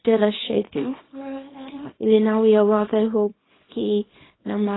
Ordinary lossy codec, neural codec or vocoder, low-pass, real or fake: AAC, 16 kbps; codec, 24 kHz, 0.9 kbps, WavTokenizer, small release; 7.2 kHz; fake